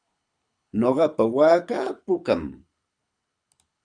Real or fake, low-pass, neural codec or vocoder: fake; 9.9 kHz; codec, 24 kHz, 6 kbps, HILCodec